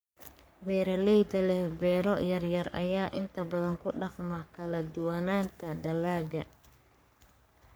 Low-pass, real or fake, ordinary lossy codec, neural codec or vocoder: none; fake; none; codec, 44.1 kHz, 3.4 kbps, Pupu-Codec